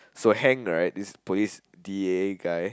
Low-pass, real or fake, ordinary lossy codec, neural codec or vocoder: none; real; none; none